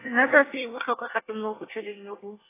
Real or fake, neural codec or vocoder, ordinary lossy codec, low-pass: fake; codec, 24 kHz, 1 kbps, SNAC; AAC, 16 kbps; 3.6 kHz